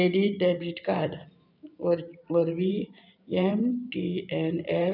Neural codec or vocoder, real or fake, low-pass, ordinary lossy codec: vocoder, 44.1 kHz, 128 mel bands every 512 samples, BigVGAN v2; fake; 5.4 kHz; none